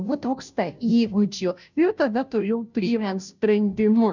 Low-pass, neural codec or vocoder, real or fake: 7.2 kHz; codec, 16 kHz, 0.5 kbps, FunCodec, trained on Chinese and English, 25 frames a second; fake